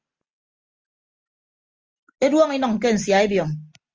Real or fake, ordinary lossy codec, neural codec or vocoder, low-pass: real; Opus, 24 kbps; none; 7.2 kHz